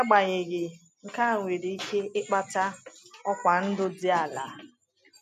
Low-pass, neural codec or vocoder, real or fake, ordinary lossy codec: 14.4 kHz; none; real; MP3, 96 kbps